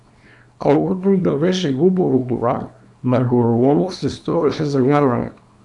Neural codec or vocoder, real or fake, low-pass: codec, 24 kHz, 0.9 kbps, WavTokenizer, small release; fake; 10.8 kHz